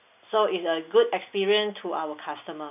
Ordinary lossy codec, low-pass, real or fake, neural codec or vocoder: none; 3.6 kHz; real; none